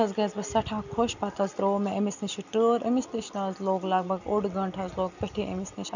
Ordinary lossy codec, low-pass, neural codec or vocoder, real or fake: none; 7.2 kHz; none; real